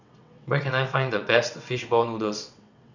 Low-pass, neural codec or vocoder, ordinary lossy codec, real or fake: 7.2 kHz; vocoder, 22.05 kHz, 80 mel bands, WaveNeXt; none; fake